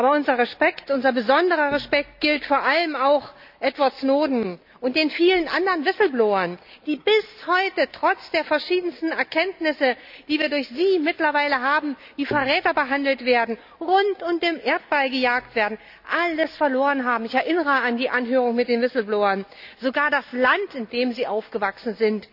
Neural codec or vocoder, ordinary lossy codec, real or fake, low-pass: none; none; real; 5.4 kHz